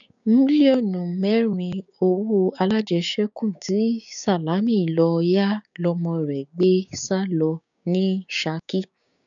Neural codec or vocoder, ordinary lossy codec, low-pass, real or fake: codec, 16 kHz, 6 kbps, DAC; none; 7.2 kHz; fake